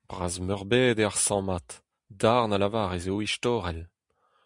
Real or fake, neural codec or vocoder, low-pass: real; none; 10.8 kHz